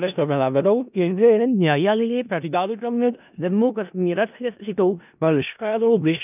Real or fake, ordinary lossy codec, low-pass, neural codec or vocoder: fake; none; 3.6 kHz; codec, 16 kHz in and 24 kHz out, 0.4 kbps, LongCat-Audio-Codec, four codebook decoder